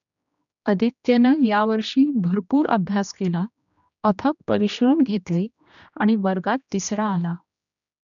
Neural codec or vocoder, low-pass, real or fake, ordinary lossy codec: codec, 16 kHz, 1 kbps, X-Codec, HuBERT features, trained on general audio; 7.2 kHz; fake; none